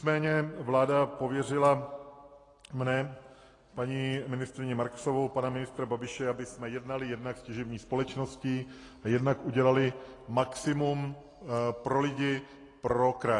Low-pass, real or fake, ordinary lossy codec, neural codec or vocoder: 10.8 kHz; real; AAC, 32 kbps; none